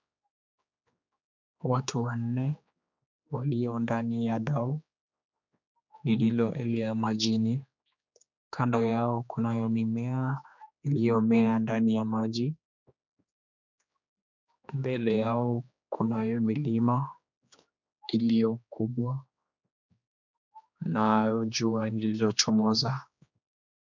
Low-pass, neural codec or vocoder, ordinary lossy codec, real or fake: 7.2 kHz; codec, 16 kHz, 2 kbps, X-Codec, HuBERT features, trained on general audio; MP3, 64 kbps; fake